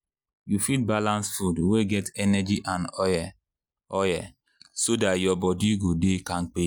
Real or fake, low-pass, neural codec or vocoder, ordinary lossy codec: real; none; none; none